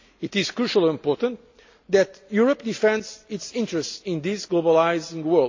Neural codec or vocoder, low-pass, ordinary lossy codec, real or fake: none; 7.2 kHz; AAC, 48 kbps; real